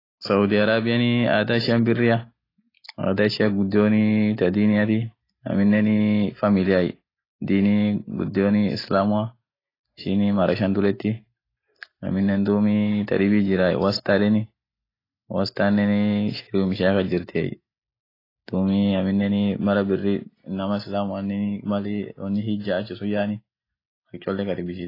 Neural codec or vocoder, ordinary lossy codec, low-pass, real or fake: none; AAC, 24 kbps; 5.4 kHz; real